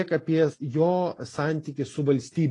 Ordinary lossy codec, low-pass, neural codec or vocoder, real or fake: AAC, 32 kbps; 10.8 kHz; none; real